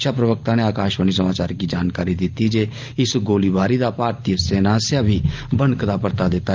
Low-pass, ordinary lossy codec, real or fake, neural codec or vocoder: 7.2 kHz; Opus, 24 kbps; real; none